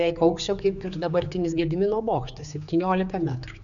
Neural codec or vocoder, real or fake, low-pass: codec, 16 kHz, 4 kbps, X-Codec, HuBERT features, trained on general audio; fake; 7.2 kHz